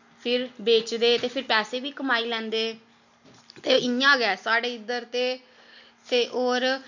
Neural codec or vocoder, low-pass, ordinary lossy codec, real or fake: none; 7.2 kHz; none; real